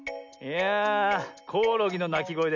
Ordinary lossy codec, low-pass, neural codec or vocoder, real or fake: none; 7.2 kHz; none; real